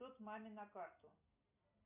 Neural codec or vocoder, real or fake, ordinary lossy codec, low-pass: none; real; MP3, 32 kbps; 3.6 kHz